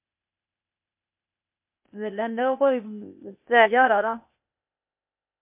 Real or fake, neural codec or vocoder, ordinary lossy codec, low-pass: fake; codec, 16 kHz, 0.8 kbps, ZipCodec; MP3, 32 kbps; 3.6 kHz